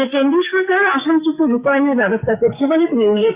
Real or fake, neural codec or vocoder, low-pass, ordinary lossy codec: fake; codec, 44.1 kHz, 2.6 kbps, SNAC; 3.6 kHz; Opus, 64 kbps